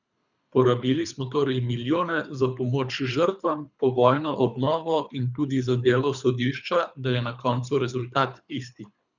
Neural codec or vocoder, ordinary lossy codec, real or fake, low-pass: codec, 24 kHz, 3 kbps, HILCodec; none; fake; 7.2 kHz